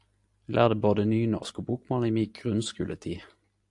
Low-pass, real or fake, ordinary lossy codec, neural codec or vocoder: 10.8 kHz; real; AAC, 64 kbps; none